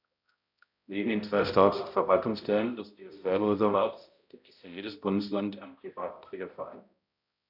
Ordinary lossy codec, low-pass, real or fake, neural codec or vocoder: none; 5.4 kHz; fake; codec, 16 kHz, 0.5 kbps, X-Codec, HuBERT features, trained on balanced general audio